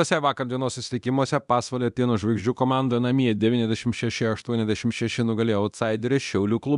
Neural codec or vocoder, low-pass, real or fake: codec, 24 kHz, 0.9 kbps, DualCodec; 10.8 kHz; fake